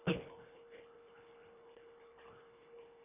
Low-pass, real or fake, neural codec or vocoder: 3.6 kHz; fake; codec, 24 kHz, 1.5 kbps, HILCodec